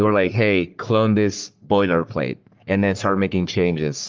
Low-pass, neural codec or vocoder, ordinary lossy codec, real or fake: 7.2 kHz; codec, 44.1 kHz, 3.4 kbps, Pupu-Codec; Opus, 32 kbps; fake